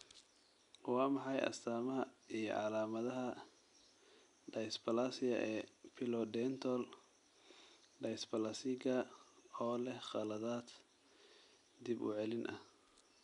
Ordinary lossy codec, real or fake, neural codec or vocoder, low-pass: none; real; none; 10.8 kHz